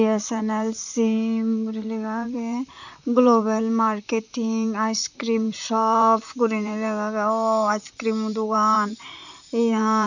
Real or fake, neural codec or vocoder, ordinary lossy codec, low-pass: fake; vocoder, 44.1 kHz, 128 mel bands, Pupu-Vocoder; none; 7.2 kHz